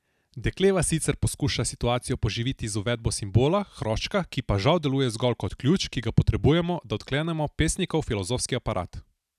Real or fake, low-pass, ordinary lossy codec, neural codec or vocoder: real; 14.4 kHz; none; none